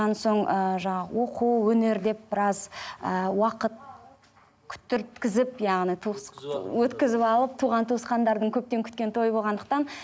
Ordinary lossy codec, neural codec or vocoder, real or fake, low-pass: none; none; real; none